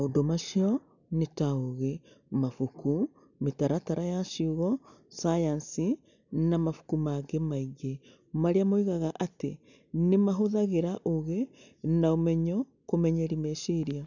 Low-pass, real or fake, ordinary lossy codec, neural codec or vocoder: 7.2 kHz; real; none; none